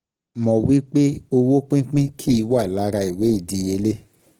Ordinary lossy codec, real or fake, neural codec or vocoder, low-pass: Opus, 16 kbps; real; none; 19.8 kHz